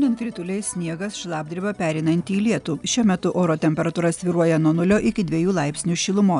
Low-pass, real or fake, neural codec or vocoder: 10.8 kHz; real; none